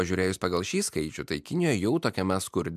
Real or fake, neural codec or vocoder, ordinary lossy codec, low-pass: real; none; MP3, 96 kbps; 14.4 kHz